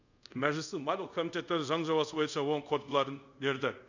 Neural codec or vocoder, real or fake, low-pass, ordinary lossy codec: codec, 24 kHz, 0.5 kbps, DualCodec; fake; 7.2 kHz; none